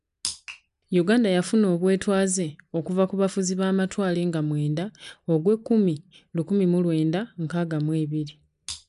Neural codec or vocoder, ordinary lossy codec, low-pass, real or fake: none; none; 10.8 kHz; real